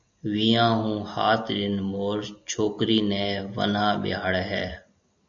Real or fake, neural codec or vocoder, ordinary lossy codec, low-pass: real; none; MP3, 64 kbps; 7.2 kHz